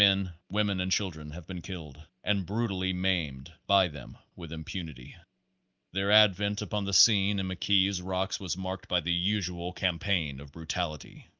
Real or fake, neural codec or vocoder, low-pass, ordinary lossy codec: real; none; 7.2 kHz; Opus, 24 kbps